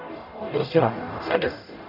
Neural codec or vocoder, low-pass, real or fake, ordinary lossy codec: codec, 44.1 kHz, 0.9 kbps, DAC; 5.4 kHz; fake; none